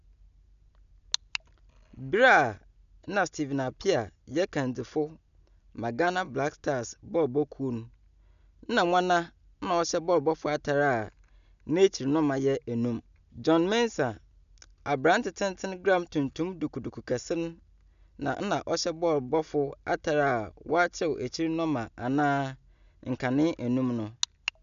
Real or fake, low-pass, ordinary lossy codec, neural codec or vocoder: real; 7.2 kHz; none; none